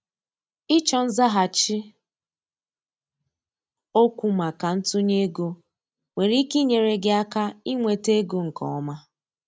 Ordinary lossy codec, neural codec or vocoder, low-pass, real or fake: none; none; none; real